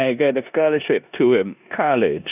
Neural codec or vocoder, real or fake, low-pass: codec, 16 kHz in and 24 kHz out, 0.9 kbps, LongCat-Audio-Codec, four codebook decoder; fake; 3.6 kHz